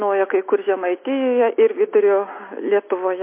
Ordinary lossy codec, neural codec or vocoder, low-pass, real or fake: MP3, 24 kbps; none; 3.6 kHz; real